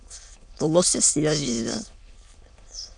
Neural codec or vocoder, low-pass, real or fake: autoencoder, 22.05 kHz, a latent of 192 numbers a frame, VITS, trained on many speakers; 9.9 kHz; fake